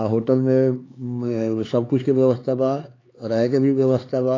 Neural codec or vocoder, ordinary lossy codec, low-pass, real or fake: codec, 16 kHz, 4 kbps, X-Codec, HuBERT features, trained on LibriSpeech; AAC, 32 kbps; 7.2 kHz; fake